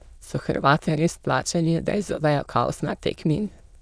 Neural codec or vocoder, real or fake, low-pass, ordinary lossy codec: autoencoder, 22.05 kHz, a latent of 192 numbers a frame, VITS, trained on many speakers; fake; none; none